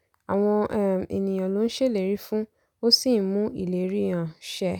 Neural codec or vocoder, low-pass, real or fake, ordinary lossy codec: none; none; real; none